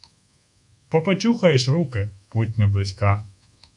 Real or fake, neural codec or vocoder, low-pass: fake; codec, 24 kHz, 1.2 kbps, DualCodec; 10.8 kHz